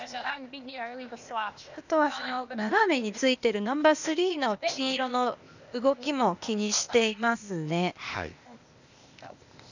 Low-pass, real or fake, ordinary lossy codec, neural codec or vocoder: 7.2 kHz; fake; none; codec, 16 kHz, 0.8 kbps, ZipCodec